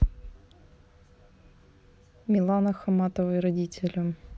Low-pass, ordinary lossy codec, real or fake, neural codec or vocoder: none; none; real; none